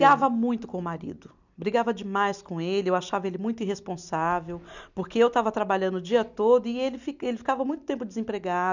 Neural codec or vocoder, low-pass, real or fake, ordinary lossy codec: none; 7.2 kHz; real; none